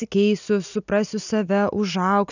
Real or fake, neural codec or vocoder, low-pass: real; none; 7.2 kHz